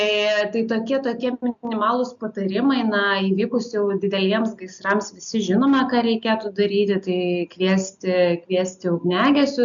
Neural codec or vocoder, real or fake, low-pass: none; real; 7.2 kHz